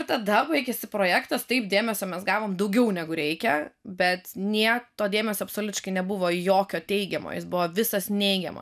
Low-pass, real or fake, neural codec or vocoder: 14.4 kHz; real; none